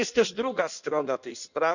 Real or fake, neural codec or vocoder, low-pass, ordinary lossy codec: fake; codec, 16 kHz in and 24 kHz out, 1.1 kbps, FireRedTTS-2 codec; 7.2 kHz; none